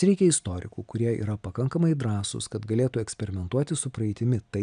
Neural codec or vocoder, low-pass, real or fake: none; 9.9 kHz; real